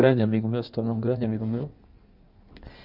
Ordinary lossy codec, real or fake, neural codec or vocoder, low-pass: none; fake; codec, 16 kHz in and 24 kHz out, 1.1 kbps, FireRedTTS-2 codec; 5.4 kHz